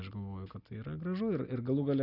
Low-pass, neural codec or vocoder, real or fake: 5.4 kHz; none; real